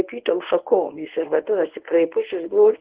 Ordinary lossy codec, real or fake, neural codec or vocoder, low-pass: Opus, 16 kbps; fake; codec, 24 kHz, 0.9 kbps, WavTokenizer, medium speech release version 1; 3.6 kHz